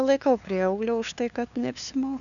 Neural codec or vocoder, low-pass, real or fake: codec, 16 kHz, 2 kbps, FunCodec, trained on LibriTTS, 25 frames a second; 7.2 kHz; fake